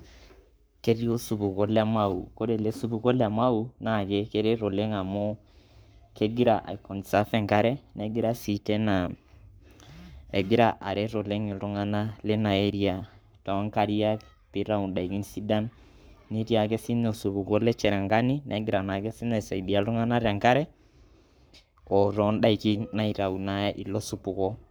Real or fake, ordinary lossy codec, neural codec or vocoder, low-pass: fake; none; codec, 44.1 kHz, 7.8 kbps, Pupu-Codec; none